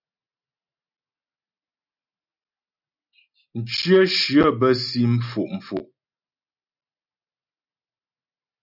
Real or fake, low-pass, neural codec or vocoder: real; 5.4 kHz; none